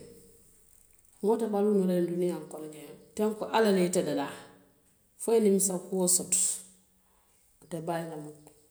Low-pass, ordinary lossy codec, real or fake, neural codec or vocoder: none; none; real; none